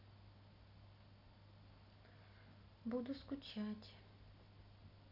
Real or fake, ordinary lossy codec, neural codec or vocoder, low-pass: real; none; none; 5.4 kHz